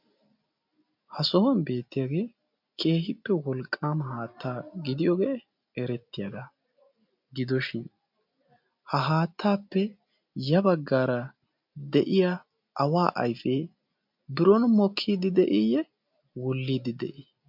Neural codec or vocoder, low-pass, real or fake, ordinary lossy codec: none; 5.4 kHz; real; MP3, 48 kbps